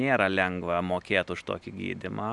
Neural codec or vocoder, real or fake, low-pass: none; real; 10.8 kHz